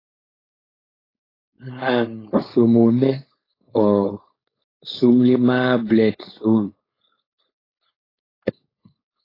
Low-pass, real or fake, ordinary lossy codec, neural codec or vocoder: 5.4 kHz; fake; AAC, 24 kbps; codec, 16 kHz, 4.8 kbps, FACodec